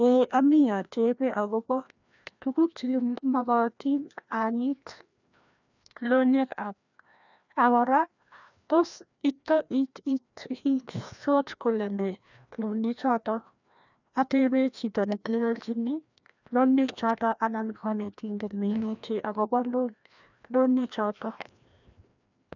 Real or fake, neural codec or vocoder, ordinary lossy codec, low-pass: fake; codec, 16 kHz, 1 kbps, FreqCodec, larger model; none; 7.2 kHz